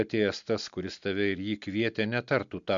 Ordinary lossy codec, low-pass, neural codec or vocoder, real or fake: MP3, 64 kbps; 7.2 kHz; none; real